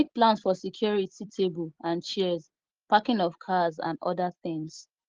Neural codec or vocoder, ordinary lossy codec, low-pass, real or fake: none; Opus, 16 kbps; 7.2 kHz; real